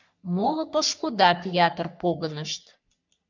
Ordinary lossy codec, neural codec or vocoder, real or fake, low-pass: MP3, 64 kbps; codec, 44.1 kHz, 3.4 kbps, Pupu-Codec; fake; 7.2 kHz